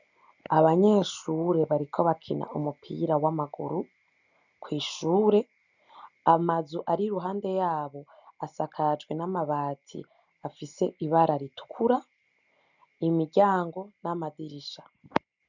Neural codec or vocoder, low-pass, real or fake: none; 7.2 kHz; real